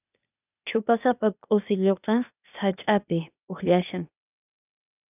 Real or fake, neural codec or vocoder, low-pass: fake; codec, 16 kHz, 0.8 kbps, ZipCodec; 3.6 kHz